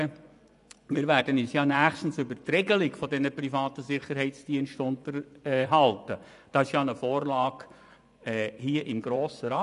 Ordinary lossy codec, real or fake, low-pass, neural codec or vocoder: none; fake; 10.8 kHz; vocoder, 24 kHz, 100 mel bands, Vocos